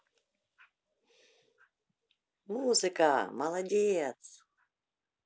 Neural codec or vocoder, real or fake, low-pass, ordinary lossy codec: none; real; none; none